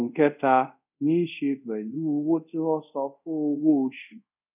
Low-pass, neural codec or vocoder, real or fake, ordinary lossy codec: 3.6 kHz; codec, 24 kHz, 0.5 kbps, DualCodec; fake; none